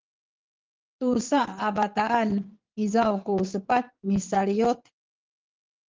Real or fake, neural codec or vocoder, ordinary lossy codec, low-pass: fake; vocoder, 22.05 kHz, 80 mel bands, WaveNeXt; Opus, 32 kbps; 7.2 kHz